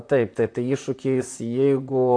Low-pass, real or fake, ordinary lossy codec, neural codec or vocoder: 9.9 kHz; fake; AAC, 48 kbps; vocoder, 44.1 kHz, 128 mel bands, Pupu-Vocoder